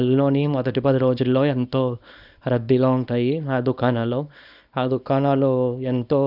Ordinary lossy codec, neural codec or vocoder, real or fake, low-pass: none; codec, 24 kHz, 0.9 kbps, WavTokenizer, small release; fake; 5.4 kHz